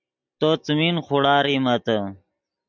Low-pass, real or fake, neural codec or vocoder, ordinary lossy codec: 7.2 kHz; real; none; MP3, 64 kbps